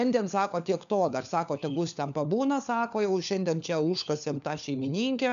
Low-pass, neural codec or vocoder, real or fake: 7.2 kHz; codec, 16 kHz, 4 kbps, FunCodec, trained on LibriTTS, 50 frames a second; fake